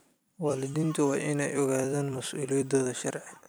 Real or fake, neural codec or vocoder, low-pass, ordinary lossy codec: fake; vocoder, 44.1 kHz, 128 mel bands every 256 samples, BigVGAN v2; none; none